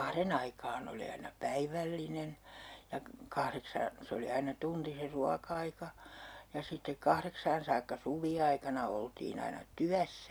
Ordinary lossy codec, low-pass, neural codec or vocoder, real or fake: none; none; none; real